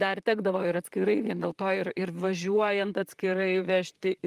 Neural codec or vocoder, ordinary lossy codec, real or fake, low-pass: vocoder, 44.1 kHz, 128 mel bands, Pupu-Vocoder; Opus, 32 kbps; fake; 14.4 kHz